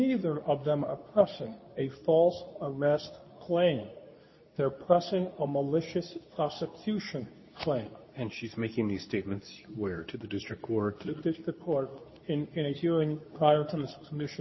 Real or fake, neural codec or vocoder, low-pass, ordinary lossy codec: fake; codec, 24 kHz, 0.9 kbps, WavTokenizer, medium speech release version 2; 7.2 kHz; MP3, 24 kbps